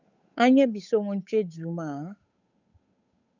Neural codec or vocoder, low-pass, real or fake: codec, 16 kHz, 8 kbps, FunCodec, trained on Chinese and English, 25 frames a second; 7.2 kHz; fake